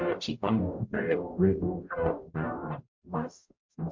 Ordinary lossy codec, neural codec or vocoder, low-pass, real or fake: MP3, 48 kbps; codec, 44.1 kHz, 0.9 kbps, DAC; 7.2 kHz; fake